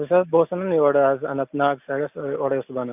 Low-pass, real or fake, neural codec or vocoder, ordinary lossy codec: 3.6 kHz; real; none; none